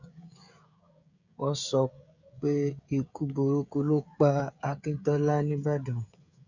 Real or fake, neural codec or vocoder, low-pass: fake; codec, 16 kHz, 16 kbps, FreqCodec, smaller model; 7.2 kHz